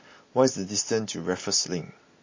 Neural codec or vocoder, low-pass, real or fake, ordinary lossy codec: none; 7.2 kHz; real; MP3, 32 kbps